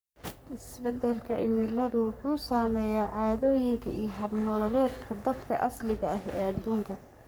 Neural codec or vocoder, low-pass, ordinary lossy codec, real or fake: codec, 44.1 kHz, 3.4 kbps, Pupu-Codec; none; none; fake